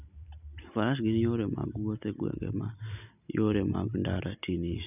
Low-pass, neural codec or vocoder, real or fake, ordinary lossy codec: 3.6 kHz; none; real; none